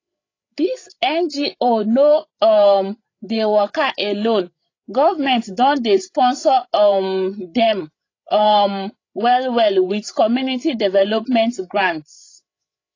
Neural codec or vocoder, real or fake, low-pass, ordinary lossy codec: codec, 16 kHz, 16 kbps, FreqCodec, larger model; fake; 7.2 kHz; AAC, 32 kbps